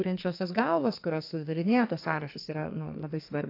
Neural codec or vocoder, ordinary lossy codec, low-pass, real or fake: codec, 44.1 kHz, 2.6 kbps, SNAC; AAC, 32 kbps; 5.4 kHz; fake